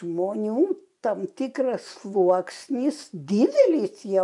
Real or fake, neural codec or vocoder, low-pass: fake; vocoder, 24 kHz, 100 mel bands, Vocos; 10.8 kHz